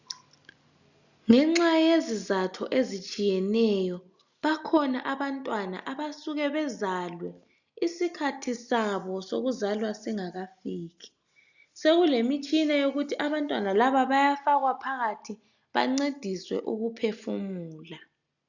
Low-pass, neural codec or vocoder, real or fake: 7.2 kHz; none; real